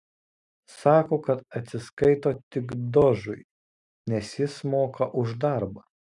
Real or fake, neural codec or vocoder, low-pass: real; none; 10.8 kHz